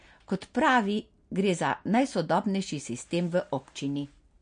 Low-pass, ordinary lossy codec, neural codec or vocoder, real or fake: 9.9 kHz; MP3, 48 kbps; none; real